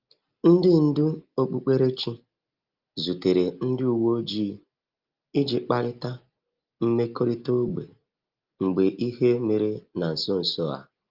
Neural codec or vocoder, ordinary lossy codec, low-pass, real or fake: none; Opus, 32 kbps; 5.4 kHz; real